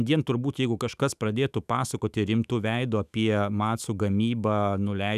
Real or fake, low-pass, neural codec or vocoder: fake; 14.4 kHz; autoencoder, 48 kHz, 128 numbers a frame, DAC-VAE, trained on Japanese speech